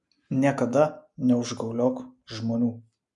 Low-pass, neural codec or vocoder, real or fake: 10.8 kHz; none; real